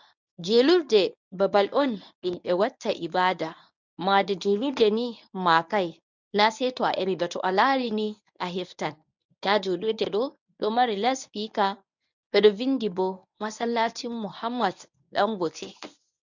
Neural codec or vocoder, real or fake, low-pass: codec, 24 kHz, 0.9 kbps, WavTokenizer, medium speech release version 1; fake; 7.2 kHz